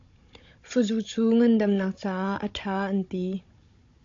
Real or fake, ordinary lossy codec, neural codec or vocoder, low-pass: fake; AAC, 48 kbps; codec, 16 kHz, 16 kbps, FunCodec, trained on Chinese and English, 50 frames a second; 7.2 kHz